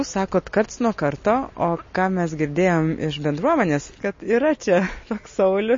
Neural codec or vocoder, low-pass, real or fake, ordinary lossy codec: none; 7.2 kHz; real; MP3, 32 kbps